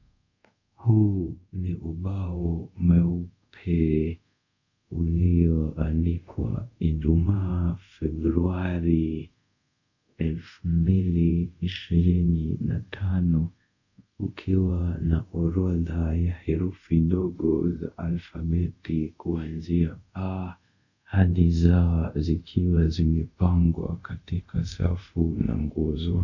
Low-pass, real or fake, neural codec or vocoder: 7.2 kHz; fake; codec, 24 kHz, 0.5 kbps, DualCodec